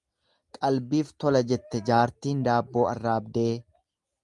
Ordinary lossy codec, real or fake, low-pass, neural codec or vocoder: Opus, 32 kbps; real; 10.8 kHz; none